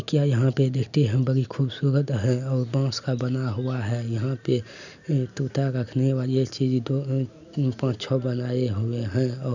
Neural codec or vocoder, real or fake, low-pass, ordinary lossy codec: none; real; 7.2 kHz; none